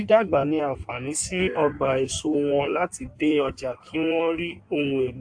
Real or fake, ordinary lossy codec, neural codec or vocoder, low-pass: fake; AAC, 64 kbps; codec, 16 kHz in and 24 kHz out, 1.1 kbps, FireRedTTS-2 codec; 9.9 kHz